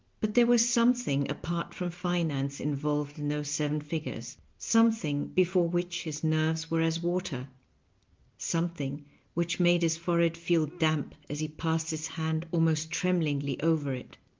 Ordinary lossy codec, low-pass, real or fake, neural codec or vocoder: Opus, 32 kbps; 7.2 kHz; real; none